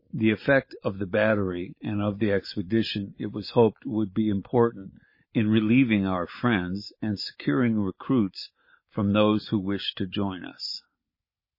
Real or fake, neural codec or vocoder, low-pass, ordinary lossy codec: real; none; 5.4 kHz; MP3, 24 kbps